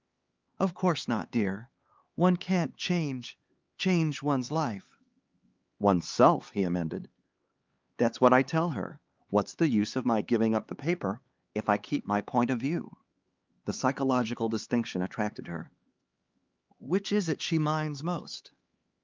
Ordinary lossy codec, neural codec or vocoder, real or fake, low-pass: Opus, 24 kbps; codec, 16 kHz, 4 kbps, X-Codec, HuBERT features, trained on LibriSpeech; fake; 7.2 kHz